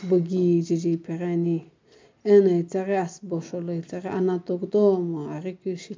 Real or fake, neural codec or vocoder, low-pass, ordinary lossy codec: real; none; 7.2 kHz; MP3, 64 kbps